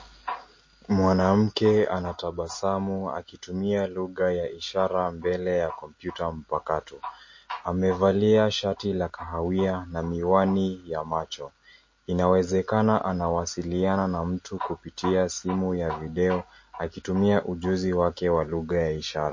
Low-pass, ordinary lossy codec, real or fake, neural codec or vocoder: 7.2 kHz; MP3, 32 kbps; real; none